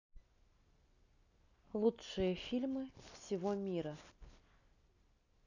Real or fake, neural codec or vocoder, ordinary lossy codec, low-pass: real; none; none; 7.2 kHz